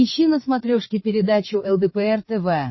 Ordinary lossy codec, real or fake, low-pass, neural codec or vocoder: MP3, 24 kbps; fake; 7.2 kHz; codec, 16 kHz, 8 kbps, FreqCodec, larger model